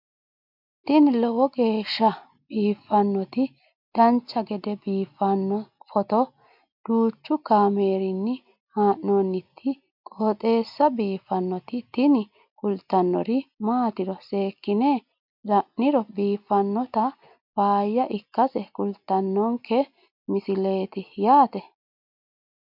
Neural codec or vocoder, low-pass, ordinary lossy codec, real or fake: none; 5.4 kHz; MP3, 48 kbps; real